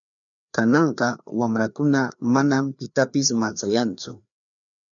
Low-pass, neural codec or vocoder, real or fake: 7.2 kHz; codec, 16 kHz, 2 kbps, FreqCodec, larger model; fake